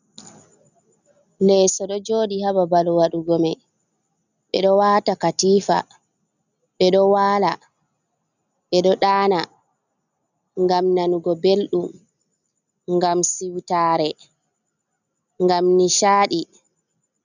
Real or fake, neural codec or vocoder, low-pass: real; none; 7.2 kHz